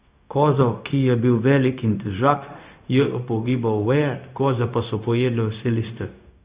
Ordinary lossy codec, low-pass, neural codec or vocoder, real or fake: Opus, 64 kbps; 3.6 kHz; codec, 16 kHz, 0.4 kbps, LongCat-Audio-Codec; fake